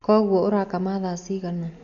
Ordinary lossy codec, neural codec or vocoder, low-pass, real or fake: none; none; 7.2 kHz; real